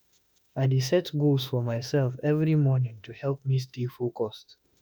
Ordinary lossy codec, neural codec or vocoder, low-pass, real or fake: none; autoencoder, 48 kHz, 32 numbers a frame, DAC-VAE, trained on Japanese speech; none; fake